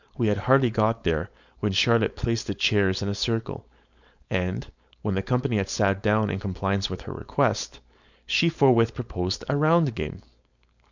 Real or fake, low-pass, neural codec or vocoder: fake; 7.2 kHz; codec, 16 kHz, 4.8 kbps, FACodec